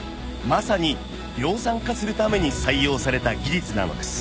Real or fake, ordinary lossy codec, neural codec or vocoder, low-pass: real; none; none; none